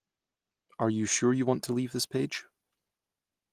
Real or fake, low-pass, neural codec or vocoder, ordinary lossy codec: real; 14.4 kHz; none; Opus, 24 kbps